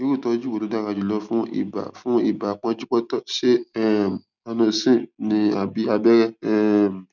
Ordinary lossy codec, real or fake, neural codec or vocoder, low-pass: none; real; none; 7.2 kHz